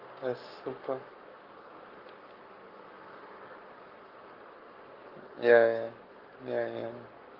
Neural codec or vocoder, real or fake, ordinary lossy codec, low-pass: codec, 44.1 kHz, 7.8 kbps, Pupu-Codec; fake; Opus, 32 kbps; 5.4 kHz